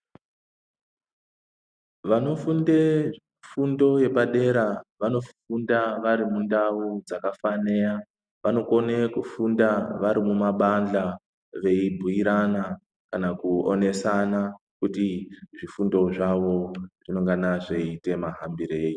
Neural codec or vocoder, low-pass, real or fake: none; 9.9 kHz; real